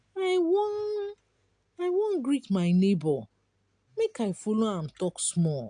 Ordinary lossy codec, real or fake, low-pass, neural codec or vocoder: AAC, 64 kbps; real; 9.9 kHz; none